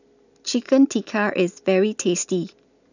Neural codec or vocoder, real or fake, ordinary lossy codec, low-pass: none; real; none; 7.2 kHz